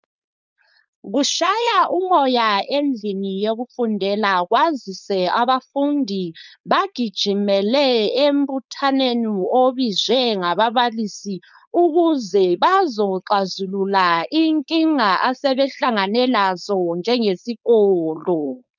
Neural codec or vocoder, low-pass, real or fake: codec, 16 kHz, 4.8 kbps, FACodec; 7.2 kHz; fake